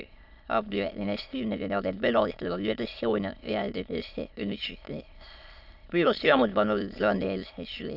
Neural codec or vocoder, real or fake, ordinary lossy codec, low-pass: autoencoder, 22.05 kHz, a latent of 192 numbers a frame, VITS, trained on many speakers; fake; none; 5.4 kHz